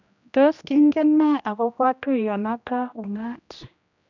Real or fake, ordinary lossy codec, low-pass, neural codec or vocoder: fake; none; 7.2 kHz; codec, 16 kHz, 1 kbps, X-Codec, HuBERT features, trained on general audio